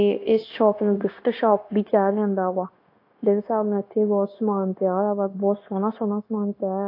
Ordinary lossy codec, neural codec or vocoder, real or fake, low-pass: AAC, 32 kbps; codec, 16 kHz, 0.9 kbps, LongCat-Audio-Codec; fake; 5.4 kHz